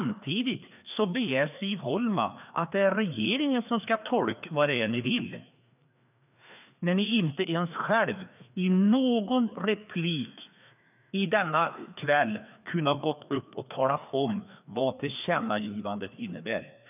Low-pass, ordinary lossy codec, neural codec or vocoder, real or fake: 3.6 kHz; none; codec, 16 kHz, 2 kbps, FreqCodec, larger model; fake